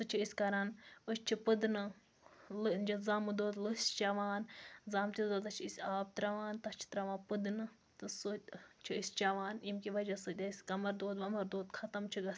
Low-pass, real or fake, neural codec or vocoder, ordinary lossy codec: none; real; none; none